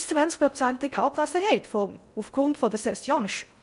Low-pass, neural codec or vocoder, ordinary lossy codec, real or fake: 10.8 kHz; codec, 16 kHz in and 24 kHz out, 0.6 kbps, FocalCodec, streaming, 4096 codes; none; fake